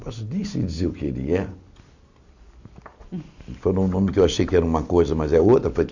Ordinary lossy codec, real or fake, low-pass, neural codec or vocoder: none; real; 7.2 kHz; none